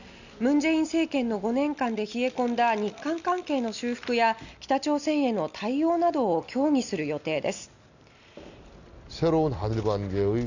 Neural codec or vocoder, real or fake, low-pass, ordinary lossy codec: none; real; 7.2 kHz; none